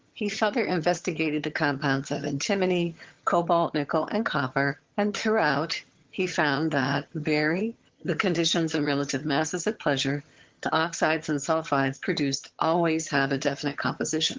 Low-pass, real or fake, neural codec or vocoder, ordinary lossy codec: 7.2 kHz; fake; vocoder, 22.05 kHz, 80 mel bands, HiFi-GAN; Opus, 16 kbps